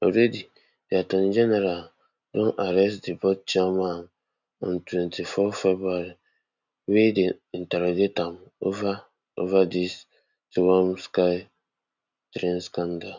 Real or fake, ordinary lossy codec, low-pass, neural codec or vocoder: real; none; 7.2 kHz; none